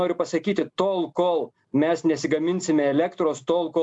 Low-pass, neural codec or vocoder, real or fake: 10.8 kHz; none; real